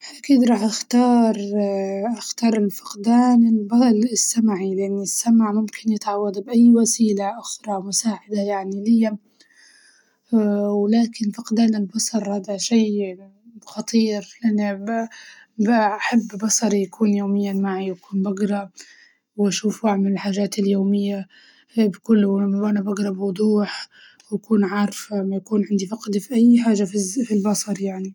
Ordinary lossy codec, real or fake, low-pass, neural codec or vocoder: none; real; 19.8 kHz; none